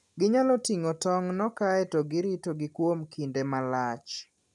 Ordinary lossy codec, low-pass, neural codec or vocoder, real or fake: none; none; none; real